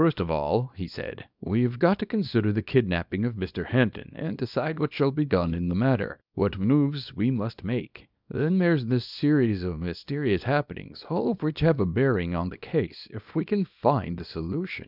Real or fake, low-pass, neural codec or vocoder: fake; 5.4 kHz; codec, 24 kHz, 0.9 kbps, WavTokenizer, small release